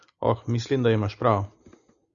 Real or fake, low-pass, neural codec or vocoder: real; 7.2 kHz; none